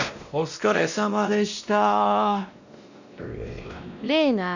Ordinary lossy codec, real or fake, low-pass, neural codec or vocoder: none; fake; 7.2 kHz; codec, 16 kHz, 1 kbps, X-Codec, WavLM features, trained on Multilingual LibriSpeech